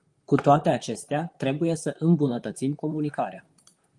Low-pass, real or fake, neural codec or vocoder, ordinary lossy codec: 10.8 kHz; fake; vocoder, 44.1 kHz, 128 mel bands, Pupu-Vocoder; Opus, 32 kbps